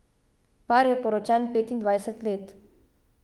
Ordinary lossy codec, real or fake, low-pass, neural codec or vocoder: Opus, 24 kbps; fake; 19.8 kHz; autoencoder, 48 kHz, 32 numbers a frame, DAC-VAE, trained on Japanese speech